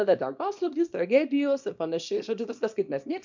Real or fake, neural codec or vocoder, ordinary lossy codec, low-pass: fake; codec, 24 kHz, 0.9 kbps, WavTokenizer, small release; MP3, 64 kbps; 7.2 kHz